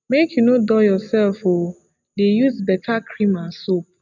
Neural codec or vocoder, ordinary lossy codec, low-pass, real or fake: none; none; 7.2 kHz; real